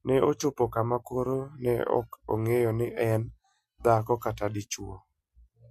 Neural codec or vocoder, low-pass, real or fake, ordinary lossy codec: none; 14.4 kHz; real; MP3, 64 kbps